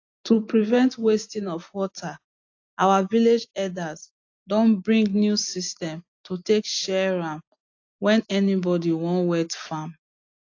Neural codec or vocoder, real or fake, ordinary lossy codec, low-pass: none; real; AAC, 48 kbps; 7.2 kHz